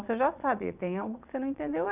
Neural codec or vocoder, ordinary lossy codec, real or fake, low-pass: vocoder, 22.05 kHz, 80 mel bands, Vocos; none; fake; 3.6 kHz